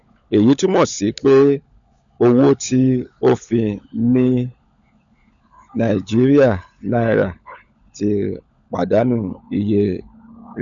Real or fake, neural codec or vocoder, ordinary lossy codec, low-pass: fake; codec, 16 kHz, 16 kbps, FunCodec, trained on LibriTTS, 50 frames a second; none; 7.2 kHz